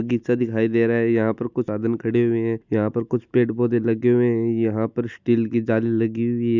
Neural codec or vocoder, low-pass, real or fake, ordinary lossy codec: none; 7.2 kHz; real; none